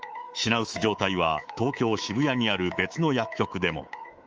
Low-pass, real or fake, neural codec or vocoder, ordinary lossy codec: 7.2 kHz; fake; codec, 24 kHz, 3.1 kbps, DualCodec; Opus, 24 kbps